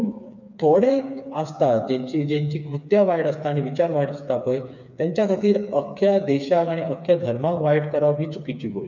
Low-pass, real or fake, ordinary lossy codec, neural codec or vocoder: 7.2 kHz; fake; none; codec, 16 kHz, 4 kbps, FreqCodec, smaller model